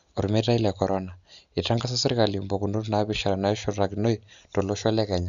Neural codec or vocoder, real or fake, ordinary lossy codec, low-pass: none; real; none; 7.2 kHz